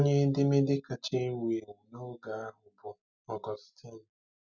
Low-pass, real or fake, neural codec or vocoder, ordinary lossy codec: 7.2 kHz; real; none; none